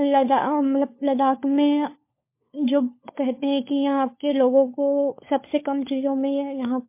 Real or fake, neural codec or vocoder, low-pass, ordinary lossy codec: fake; codec, 16 kHz, 4 kbps, FunCodec, trained on LibriTTS, 50 frames a second; 3.6 kHz; MP3, 24 kbps